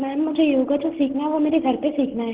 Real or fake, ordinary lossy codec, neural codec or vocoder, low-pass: real; Opus, 16 kbps; none; 3.6 kHz